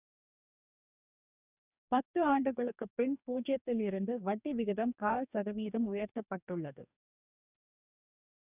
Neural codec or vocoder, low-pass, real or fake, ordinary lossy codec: codec, 44.1 kHz, 2.6 kbps, DAC; 3.6 kHz; fake; none